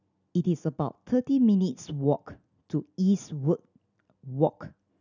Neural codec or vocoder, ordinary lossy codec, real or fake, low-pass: none; none; real; 7.2 kHz